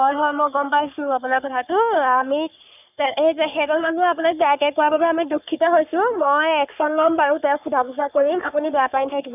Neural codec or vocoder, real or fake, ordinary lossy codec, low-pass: codec, 44.1 kHz, 3.4 kbps, Pupu-Codec; fake; none; 3.6 kHz